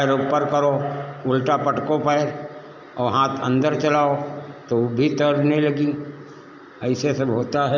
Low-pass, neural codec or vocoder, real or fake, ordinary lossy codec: 7.2 kHz; none; real; none